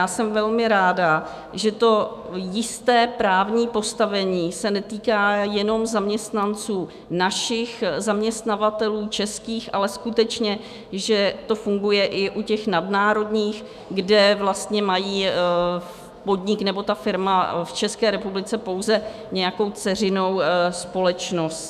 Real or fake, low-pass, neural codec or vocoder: fake; 14.4 kHz; autoencoder, 48 kHz, 128 numbers a frame, DAC-VAE, trained on Japanese speech